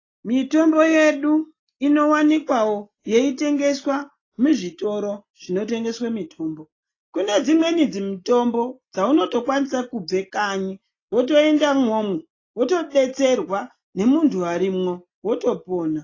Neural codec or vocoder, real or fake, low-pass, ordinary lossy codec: none; real; 7.2 kHz; AAC, 32 kbps